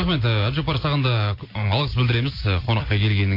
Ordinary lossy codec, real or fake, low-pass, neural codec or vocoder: MP3, 32 kbps; real; 5.4 kHz; none